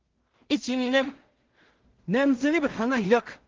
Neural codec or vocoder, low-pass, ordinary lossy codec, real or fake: codec, 16 kHz in and 24 kHz out, 0.4 kbps, LongCat-Audio-Codec, two codebook decoder; 7.2 kHz; Opus, 16 kbps; fake